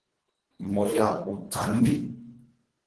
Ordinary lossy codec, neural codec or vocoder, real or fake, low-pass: Opus, 16 kbps; codec, 24 kHz, 1.5 kbps, HILCodec; fake; 10.8 kHz